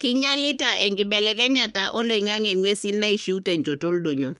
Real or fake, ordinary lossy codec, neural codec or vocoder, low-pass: fake; none; codec, 24 kHz, 1 kbps, SNAC; 10.8 kHz